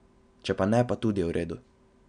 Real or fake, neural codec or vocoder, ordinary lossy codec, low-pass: real; none; MP3, 96 kbps; 9.9 kHz